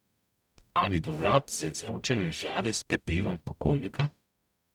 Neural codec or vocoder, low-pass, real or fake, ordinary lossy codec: codec, 44.1 kHz, 0.9 kbps, DAC; 19.8 kHz; fake; none